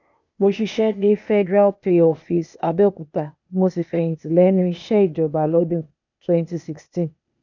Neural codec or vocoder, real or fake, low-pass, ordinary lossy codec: codec, 16 kHz, 0.8 kbps, ZipCodec; fake; 7.2 kHz; none